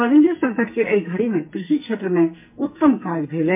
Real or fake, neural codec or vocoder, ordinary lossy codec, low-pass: fake; codec, 44.1 kHz, 2.6 kbps, SNAC; MP3, 32 kbps; 3.6 kHz